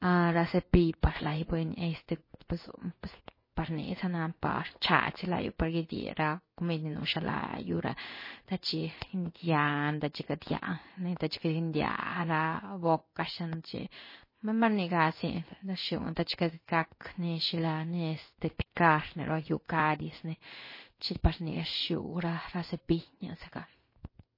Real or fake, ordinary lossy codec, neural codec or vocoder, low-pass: fake; MP3, 24 kbps; codec, 16 kHz in and 24 kHz out, 1 kbps, XY-Tokenizer; 5.4 kHz